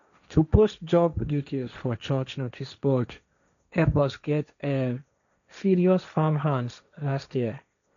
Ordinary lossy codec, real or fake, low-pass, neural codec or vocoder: none; fake; 7.2 kHz; codec, 16 kHz, 1.1 kbps, Voila-Tokenizer